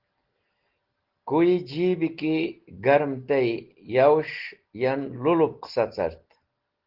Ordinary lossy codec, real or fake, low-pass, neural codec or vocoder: Opus, 16 kbps; real; 5.4 kHz; none